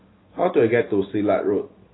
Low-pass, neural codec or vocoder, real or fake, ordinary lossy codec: 7.2 kHz; none; real; AAC, 16 kbps